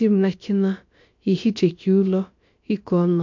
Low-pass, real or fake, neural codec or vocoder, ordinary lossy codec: 7.2 kHz; fake; codec, 16 kHz, about 1 kbps, DyCAST, with the encoder's durations; MP3, 48 kbps